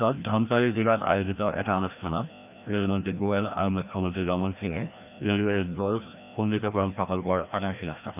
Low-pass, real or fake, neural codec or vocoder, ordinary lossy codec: 3.6 kHz; fake; codec, 16 kHz, 1 kbps, FreqCodec, larger model; none